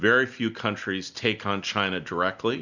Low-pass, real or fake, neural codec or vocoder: 7.2 kHz; real; none